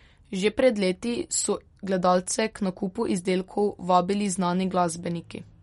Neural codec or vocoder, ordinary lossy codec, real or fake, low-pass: none; MP3, 48 kbps; real; 14.4 kHz